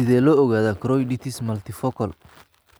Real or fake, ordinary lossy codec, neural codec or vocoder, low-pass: real; none; none; none